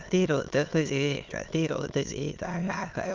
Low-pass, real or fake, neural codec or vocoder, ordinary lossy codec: 7.2 kHz; fake; autoencoder, 22.05 kHz, a latent of 192 numbers a frame, VITS, trained on many speakers; Opus, 32 kbps